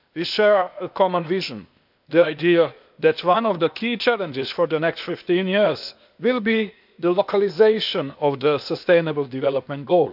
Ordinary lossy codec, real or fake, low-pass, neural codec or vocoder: none; fake; 5.4 kHz; codec, 16 kHz, 0.8 kbps, ZipCodec